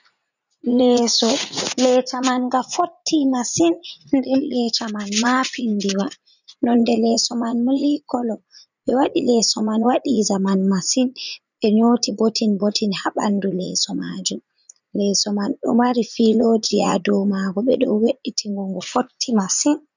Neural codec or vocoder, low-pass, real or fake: vocoder, 44.1 kHz, 80 mel bands, Vocos; 7.2 kHz; fake